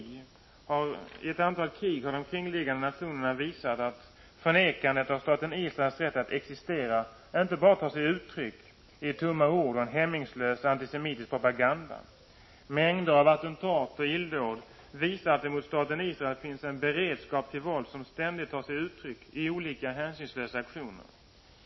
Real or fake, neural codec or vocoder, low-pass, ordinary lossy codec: real; none; 7.2 kHz; MP3, 24 kbps